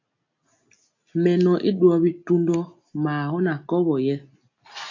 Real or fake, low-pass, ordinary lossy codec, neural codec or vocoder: real; 7.2 kHz; AAC, 48 kbps; none